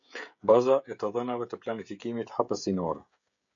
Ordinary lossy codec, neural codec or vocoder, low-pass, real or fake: MP3, 64 kbps; codec, 16 kHz, 16 kbps, FreqCodec, smaller model; 7.2 kHz; fake